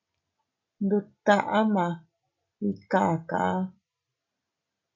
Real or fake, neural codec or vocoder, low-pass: real; none; 7.2 kHz